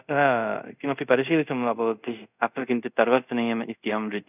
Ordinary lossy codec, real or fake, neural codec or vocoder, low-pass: none; fake; codec, 24 kHz, 0.5 kbps, DualCodec; 3.6 kHz